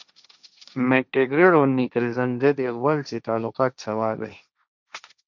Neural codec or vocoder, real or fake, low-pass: codec, 16 kHz, 1.1 kbps, Voila-Tokenizer; fake; 7.2 kHz